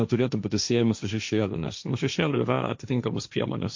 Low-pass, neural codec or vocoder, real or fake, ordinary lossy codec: 7.2 kHz; codec, 16 kHz, 1.1 kbps, Voila-Tokenizer; fake; MP3, 48 kbps